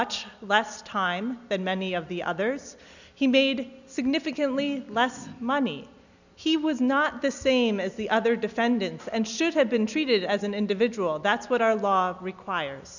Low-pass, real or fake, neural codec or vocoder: 7.2 kHz; real; none